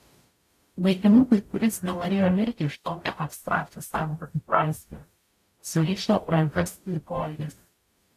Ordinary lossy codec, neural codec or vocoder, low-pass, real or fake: AAC, 64 kbps; codec, 44.1 kHz, 0.9 kbps, DAC; 14.4 kHz; fake